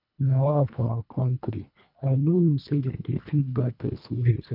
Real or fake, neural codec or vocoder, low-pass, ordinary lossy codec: fake; codec, 24 kHz, 1.5 kbps, HILCodec; 5.4 kHz; none